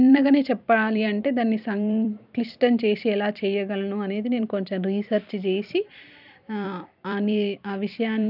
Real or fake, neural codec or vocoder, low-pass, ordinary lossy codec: fake; vocoder, 44.1 kHz, 128 mel bands every 256 samples, BigVGAN v2; 5.4 kHz; none